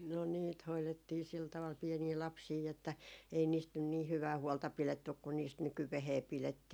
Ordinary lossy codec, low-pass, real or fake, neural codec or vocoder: none; none; real; none